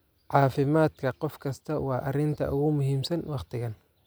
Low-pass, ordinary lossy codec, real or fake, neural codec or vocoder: none; none; real; none